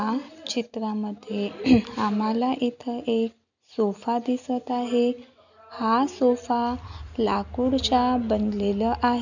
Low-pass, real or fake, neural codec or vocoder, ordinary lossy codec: 7.2 kHz; real; none; none